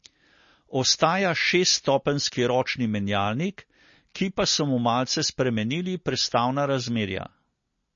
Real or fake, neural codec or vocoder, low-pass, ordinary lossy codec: real; none; 7.2 kHz; MP3, 32 kbps